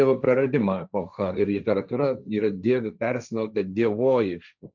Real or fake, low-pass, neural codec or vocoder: fake; 7.2 kHz; codec, 16 kHz, 1.1 kbps, Voila-Tokenizer